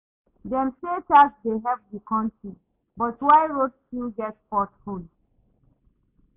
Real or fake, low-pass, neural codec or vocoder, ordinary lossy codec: real; 3.6 kHz; none; AAC, 32 kbps